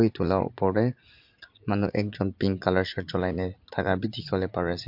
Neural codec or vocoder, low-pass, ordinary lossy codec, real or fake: vocoder, 44.1 kHz, 80 mel bands, Vocos; 5.4 kHz; MP3, 48 kbps; fake